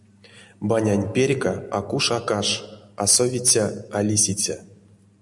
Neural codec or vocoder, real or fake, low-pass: none; real; 10.8 kHz